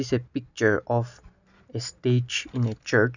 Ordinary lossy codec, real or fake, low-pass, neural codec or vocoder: none; real; 7.2 kHz; none